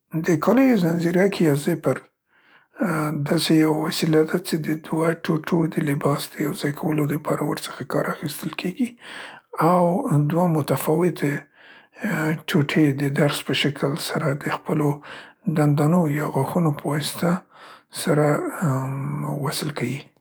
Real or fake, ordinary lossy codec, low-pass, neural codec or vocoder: fake; none; none; codec, 44.1 kHz, 7.8 kbps, DAC